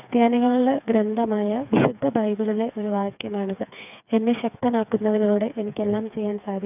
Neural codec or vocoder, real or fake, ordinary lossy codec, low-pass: codec, 16 kHz, 4 kbps, FreqCodec, smaller model; fake; none; 3.6 kHz